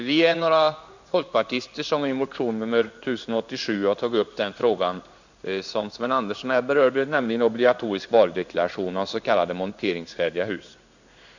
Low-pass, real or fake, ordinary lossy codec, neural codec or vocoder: 7.2 kHz; fake; none; codec, 16 kHz in and 24 kHz out, 1 kbps, XY-Tokenizer